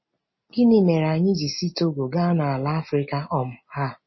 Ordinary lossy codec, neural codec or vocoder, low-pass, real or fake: MP3, 24 kbps; none; 7.2 kHz; real